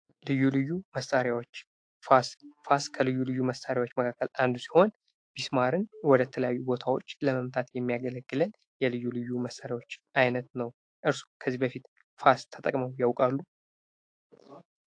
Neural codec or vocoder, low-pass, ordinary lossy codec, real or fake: autoencoder, 48 kHz, 128 numbers a frame, DAC-VAE, trained on Japanese speech; 9.9 kHz; AAC, 48 kbps; fake